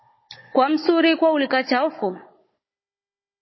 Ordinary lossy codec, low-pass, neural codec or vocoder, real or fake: MP3, 24 kbps; 7.2 kHz; codec, 16 kHz, 16 kbps, FunCodec, trained on Chinese and English, 50 frames a second; fake